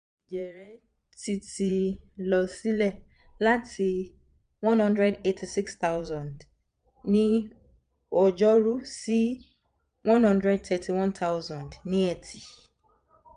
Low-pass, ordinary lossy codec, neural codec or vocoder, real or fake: 9.9 kHz; none; vocoder, 22.05 kHz, 80 mel bands, Vocos; fake